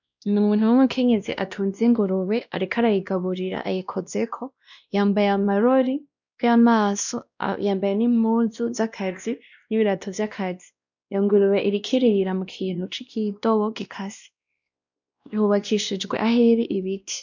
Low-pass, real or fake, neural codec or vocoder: 7.2 kHz; fake; codec, 16 kHz, 1 kbps, X-Codec, WavLM features, trained on Multilingual LibriSpeech